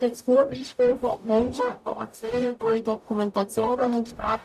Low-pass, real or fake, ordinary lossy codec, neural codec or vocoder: 14.4 kHz; fake; none; codec, 44.1 kHz, 0.9 kbps, DAC